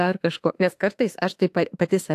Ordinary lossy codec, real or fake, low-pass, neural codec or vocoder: AAC, 64 kbps; fake; 14.4 kHz; autoencoder, 48 kHz, 32 numbers a frame, DAC-VAE, trained on Japanese speech